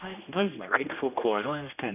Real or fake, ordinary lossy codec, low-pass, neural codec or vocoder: fake; none; 3.6 kHz; codec, 16 kHz, 1 kbps, X-Codec, HuBERT features, trained on general audio